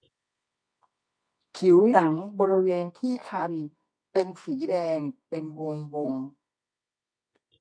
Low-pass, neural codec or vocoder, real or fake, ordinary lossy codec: 9.9 kHz; codec, 24 kHz, 0.9 kbps, WavTokenizer, medium music audio release; fake; MP3, 48 kbps